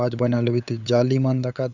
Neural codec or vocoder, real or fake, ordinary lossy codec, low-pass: codec, 16 kHz, 8 kbps, FunCodec, trained on LibriTTS, 25 frames a second; fake; none; 7.2 kHz